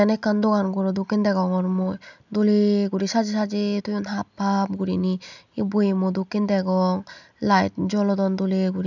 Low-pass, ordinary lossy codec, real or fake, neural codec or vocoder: 7.2 kHz; none; real; none